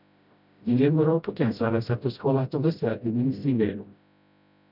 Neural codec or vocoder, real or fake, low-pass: codec, 16 kHz, 0.5 kbps, FreqCodec, smaller model; fake; 5.4 kHz